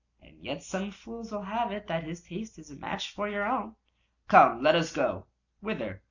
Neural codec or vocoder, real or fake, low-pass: none; real; 7.2 kHz